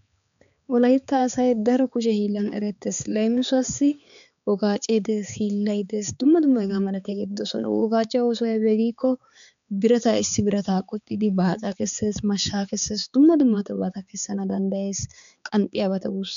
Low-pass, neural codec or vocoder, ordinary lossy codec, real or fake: 7.2 kHz; codec, 16 kHz, 4 kbps, X-Codec, HuBERT features, trained on balanced general audio; MP3, 96 kbps; fake